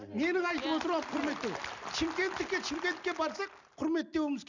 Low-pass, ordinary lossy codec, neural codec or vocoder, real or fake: 7.2 kHz; none; none; real